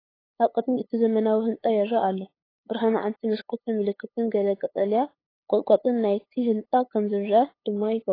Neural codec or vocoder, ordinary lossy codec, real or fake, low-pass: codec, 16 kHz, 8 kbps, FunCodec, trained on LibriTTS, 25 frames a second; AAC, 24 kbps; fake; 5.4 kHz